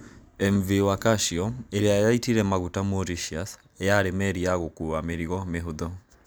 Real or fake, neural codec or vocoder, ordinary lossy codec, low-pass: real; none; none; none